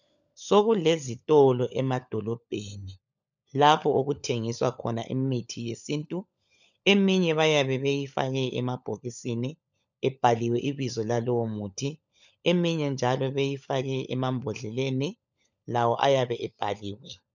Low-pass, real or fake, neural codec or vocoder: 7.2 kHz; fake; codec, 16 kHz, 16 kbps, FunCodec, trained on LibriTTS, 50 frames a second